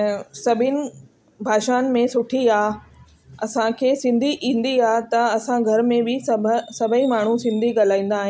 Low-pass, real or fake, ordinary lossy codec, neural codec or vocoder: none; real; none; none